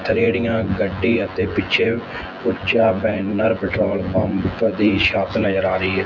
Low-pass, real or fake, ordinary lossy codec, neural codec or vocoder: 7.2 kHz; fake; none; vocoder, 24 kHz, 100 mel bands, Vocos